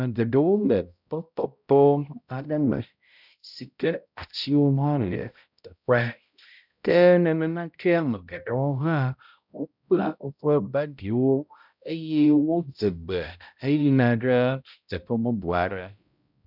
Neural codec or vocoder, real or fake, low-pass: codec, 16 kHz, 0.5 kbps, X-Codec, HuBERT features, trained on balanced general audio; fake; 5.4 kHz